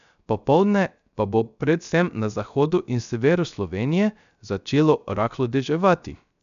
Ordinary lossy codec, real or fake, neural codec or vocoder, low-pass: none; fake; codec, 16 kHz, 0.3 kbps, FocalCodec; 7.2 kHz